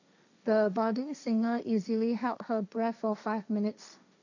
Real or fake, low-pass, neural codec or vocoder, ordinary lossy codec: fake; 7.2 kHz; codec, 16 kHz, 1.1 kbps, Voila-Tokenizer; none